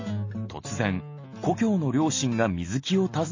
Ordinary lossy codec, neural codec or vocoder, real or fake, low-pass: MP3, 32 kbps; none; real; 7.2 kHz